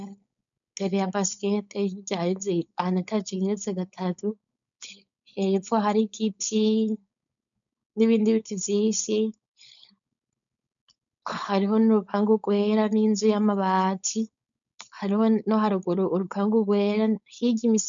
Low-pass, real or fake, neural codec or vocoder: 7.2 kHz; fake; codec, 16 kHz, 4.8 kbps, FACodec